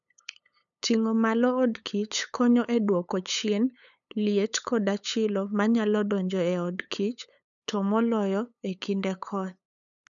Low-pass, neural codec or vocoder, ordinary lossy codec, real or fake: 7.2 kHz; codec, 16 kHz, 8 kbps, FunCodec, trained on LibriTTS, 25 frames a second; none; fake